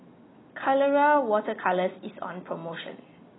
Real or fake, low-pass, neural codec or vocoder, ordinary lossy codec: real; 7.2 kHz; none; AAC, 16 kbps